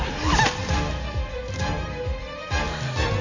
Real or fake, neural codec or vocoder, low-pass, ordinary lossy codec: real; none; 7.2 kHz; AAC, 48 kbps